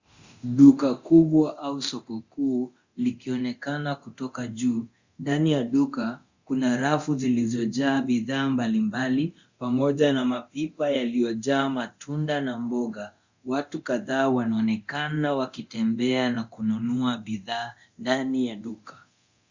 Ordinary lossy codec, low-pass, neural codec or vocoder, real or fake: Opus, 64 kbps; 7.2 kHz; codec, 24 kHz, 0.9 kbps, DualCodec; fake